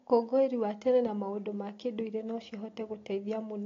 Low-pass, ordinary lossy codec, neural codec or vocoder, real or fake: 7.2 kHz; AAC, 32 kbps; none; real